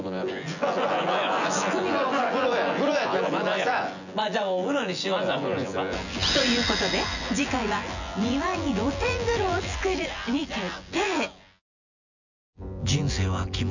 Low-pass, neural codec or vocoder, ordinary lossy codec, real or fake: 7.2 kHz; vocoder, 24 kHz, 100 mel bands, Vocos; none; fake